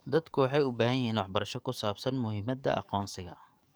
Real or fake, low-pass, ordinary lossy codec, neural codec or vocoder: fake; none; none; codec, 44.1 kHz, 7.8 kbps, DAC